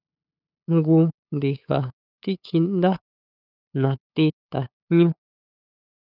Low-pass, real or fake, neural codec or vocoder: 5.4 kHz; fake; codec, 16 kHz, 8 kbps, FunCodec, trained on LibriTTS, 25 frames a second